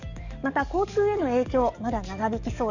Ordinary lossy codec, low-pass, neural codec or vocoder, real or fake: none; 7.2 kHz; codec, 44.1 kHz, 7.8 kbps, DAC; fake